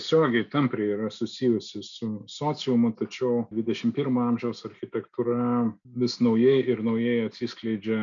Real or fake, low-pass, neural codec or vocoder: real; 7.2 kHz; none